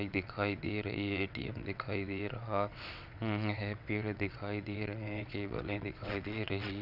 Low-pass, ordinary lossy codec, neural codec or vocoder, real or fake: 5.4 kHz; none; vocoder, 22.05 kHz, 80 mel bands, WaveNeXt; fake